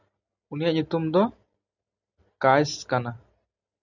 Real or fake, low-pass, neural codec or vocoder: real; 7.2 kHz; none